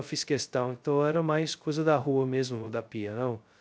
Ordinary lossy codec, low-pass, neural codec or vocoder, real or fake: none; none; codec, 16 kHz, 0.2 kbps, FocalCodec; fake